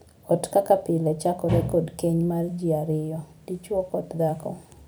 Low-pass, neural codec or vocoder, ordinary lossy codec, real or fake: none; vocoder, 44.1 kHz, 128 mel bands every 256 samples, BigVGAN v2; none; fake